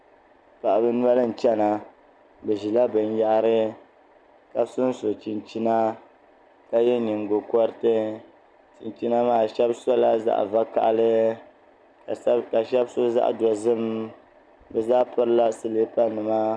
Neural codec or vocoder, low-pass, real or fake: none; 9.9 kHz; real